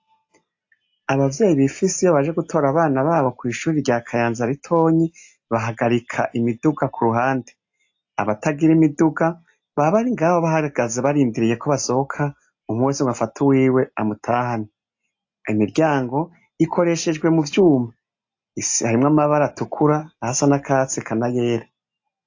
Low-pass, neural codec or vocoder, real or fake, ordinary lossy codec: 7.2 kHz; none; real; AAC, 48 kbps